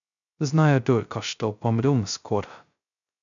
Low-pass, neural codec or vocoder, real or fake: 7.2 kHz; codec, 16 kHz, 0.2 kbps, FocalCodec; fake